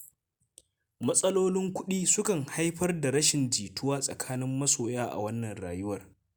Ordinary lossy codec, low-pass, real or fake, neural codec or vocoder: none; none; real; none